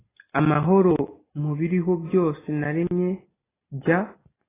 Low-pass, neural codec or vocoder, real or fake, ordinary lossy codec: 3.6 kHz; none; real; AAC, 16 kbps